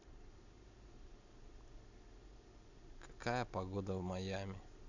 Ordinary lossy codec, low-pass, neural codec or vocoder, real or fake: none; 7.2 kHz; none; real